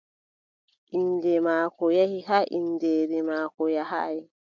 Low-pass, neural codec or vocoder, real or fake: 7.2 kHz; none; real